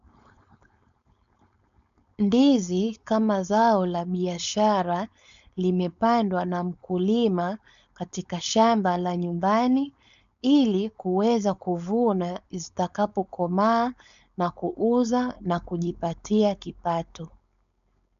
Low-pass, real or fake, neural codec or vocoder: 7.2 kHz; fake; codec, 16 kHz, 4.8 kbps, FACodec